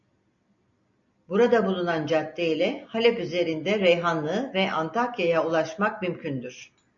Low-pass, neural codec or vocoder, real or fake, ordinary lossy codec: 7.2 kHz; none; real; MP3, 48 kbps